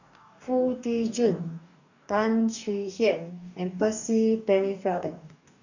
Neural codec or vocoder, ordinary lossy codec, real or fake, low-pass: codec, 44.1 kHz, 2.6 kbps, DAC; none; fake; 7.2 kHz